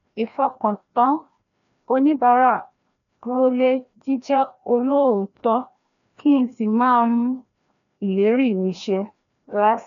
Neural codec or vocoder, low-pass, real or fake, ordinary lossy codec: codec, 16 kHz, 1 kbps, FreqCodec, larger model; 7.2 kHz; fake; none